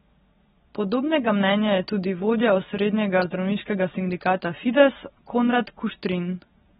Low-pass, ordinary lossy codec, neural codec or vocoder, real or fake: 19.8 kHz; AAC, 16 kbps; none; real